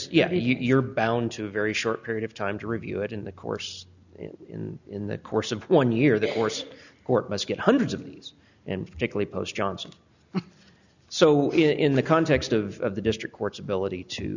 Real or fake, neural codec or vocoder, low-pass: real; none; 7.2 kHz